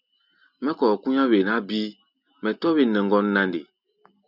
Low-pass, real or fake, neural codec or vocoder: 5.4 kHz; real; none